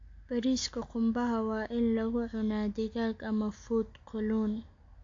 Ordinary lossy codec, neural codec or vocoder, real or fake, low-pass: AAC, 48 kbps; none; real; 7.2 kHz